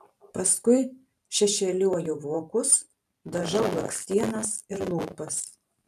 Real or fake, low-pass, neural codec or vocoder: fake; 14.4 kHz; vocoder, 44.1 kHz, 128 mel bands every 512 samples, BigVGAN v2